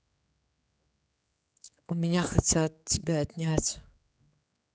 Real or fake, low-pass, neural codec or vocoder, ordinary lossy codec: fake; none; codec, 16 kHz, 4 kbps, X-Codec, HuBERT features, trained on general audio; none